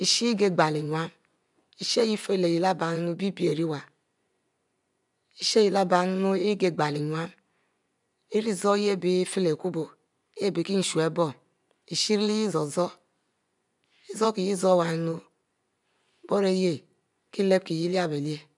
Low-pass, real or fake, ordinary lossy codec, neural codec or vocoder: 10.8 kHz; fake; none; vocoder, 24 kHz, 100 mel bands, Vocos